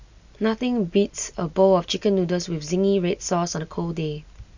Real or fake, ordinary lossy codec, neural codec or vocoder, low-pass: real; Opus, 64 kbps; none; 7.2 kHz